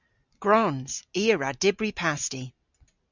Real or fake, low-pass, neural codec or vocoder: real; 7.2 kHz; none